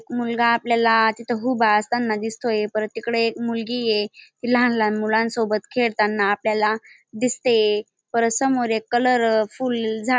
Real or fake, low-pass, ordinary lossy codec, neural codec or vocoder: real; none; none; none